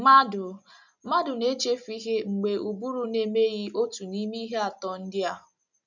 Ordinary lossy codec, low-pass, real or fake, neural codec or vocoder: none; 7.2 kHz; real; none